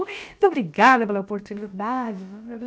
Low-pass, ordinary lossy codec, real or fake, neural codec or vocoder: none; none; fake; codec, 16 kHz, about 1 kbps, DyCAST, with the encoder's durations